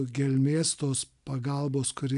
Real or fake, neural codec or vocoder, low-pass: real; none; 10.8 kHz